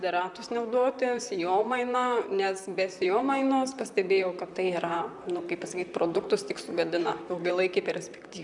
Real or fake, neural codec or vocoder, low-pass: fake; vocoder, 44.1 kHz, 128 mel bands, Pupu-Vocoder; 10.8 kHz